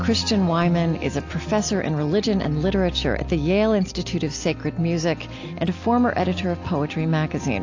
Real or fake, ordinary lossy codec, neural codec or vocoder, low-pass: real; AAC, 48 kbps; none; 7.2 kHz